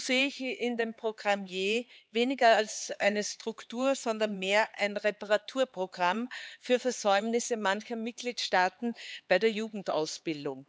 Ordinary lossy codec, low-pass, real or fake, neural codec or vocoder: none; none; fake; codec, 16 kHz, 4 kbps, X-Codec, HuBERT features, trained on LibriSpeech